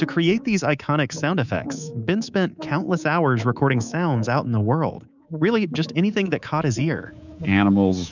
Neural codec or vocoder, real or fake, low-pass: codec, 24 kHz, 3.1 kbps, DualCodec; fake; 7.2 kHz